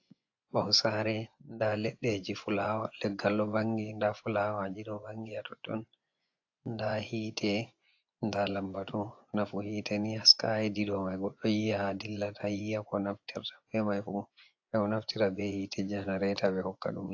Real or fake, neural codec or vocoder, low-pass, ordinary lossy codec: fake; vocoder, 24 kHz, 100 mel bands, Vocos; 7.2 kHz; AAC, 48 kbps